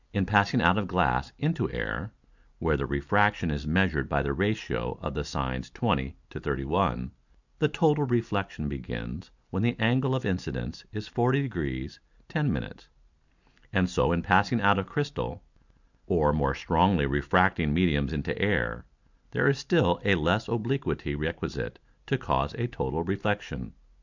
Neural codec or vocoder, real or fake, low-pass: none; real; 7.2 kHz